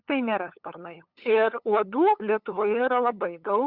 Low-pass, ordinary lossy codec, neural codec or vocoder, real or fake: 5.4 kHz; Opus, 64 kbps; codec, 16 kHz, 8 kbps, FunCodec, trained on LibriTTS, 25 frames a second; fake